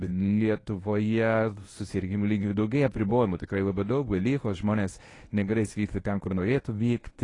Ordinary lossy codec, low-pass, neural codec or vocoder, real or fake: AAC, 32 kbps; 10.8 kHz; codec, 24 kHz, 0.9 kbps, WavTokenizer, medium speech release version 1; fake